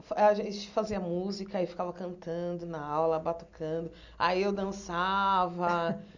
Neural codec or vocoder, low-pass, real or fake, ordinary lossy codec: none; 7.2 kHz; real; none